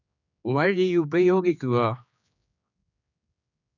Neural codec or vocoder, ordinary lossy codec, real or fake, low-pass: codec, 16 kHz, 4 kbps, X-Codec, HuBERT features, trained on general audio; none; fake; 7.2 kHz